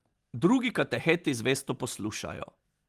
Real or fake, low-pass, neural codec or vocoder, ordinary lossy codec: real; 14.4 kHz; none; Opus, 24 kbps